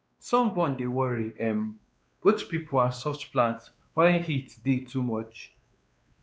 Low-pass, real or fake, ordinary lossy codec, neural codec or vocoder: none; fake; none; codec, 16 kHz, 2 kbps, X-Codec, WavLM features, trained on Multilingual LibriSpeech